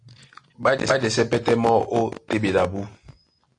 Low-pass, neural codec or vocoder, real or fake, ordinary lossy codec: 9.9 kHz; none; real; AAC, 48 kbps